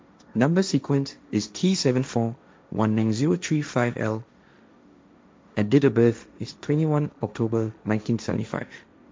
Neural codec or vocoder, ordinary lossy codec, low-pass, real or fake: codec, 16 kHz, 1.1 kbps, Voila-Tokenizer; none; none; fake